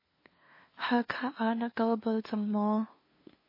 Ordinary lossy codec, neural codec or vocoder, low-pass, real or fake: MP3, 24 kbps; codec, 16 kHz, 2 kbps, FunCodec, trained on LibriTTS, 25 frames a second; 5.4 kHz; fake